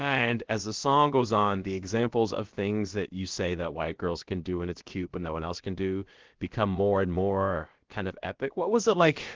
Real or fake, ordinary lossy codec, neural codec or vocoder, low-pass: fake; Opus, 16 kbps; codec, 16 kHz, about 1 kbps, DyCAST, with the encoder's durations; 7.2 kHz